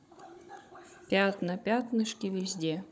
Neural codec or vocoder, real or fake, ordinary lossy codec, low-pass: codec, 16 kHz, 16 kbps, FunCodec, trained on Chinese and English, 50 frames a second; fake; none; none